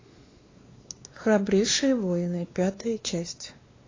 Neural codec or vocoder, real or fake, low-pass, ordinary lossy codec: codec, 16 kHz, 2 kbps, X-Codec, WavLM features, trained on Multilingual LibriSpeech; fake; 7.2 kHz; AAC, 32 kbps